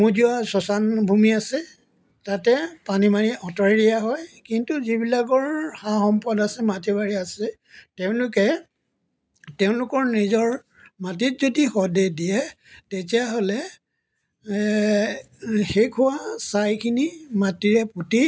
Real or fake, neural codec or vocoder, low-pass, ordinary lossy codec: real; none; none; none